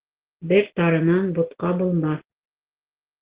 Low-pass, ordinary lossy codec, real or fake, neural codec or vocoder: 3.6 kHz; Opus, 16 kbps; real; none